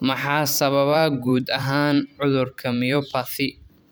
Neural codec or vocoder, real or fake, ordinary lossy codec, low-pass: vocoder, 44.1 kHz, 128 mel bands every 512 samples, BigVGAN v2; fake; none; none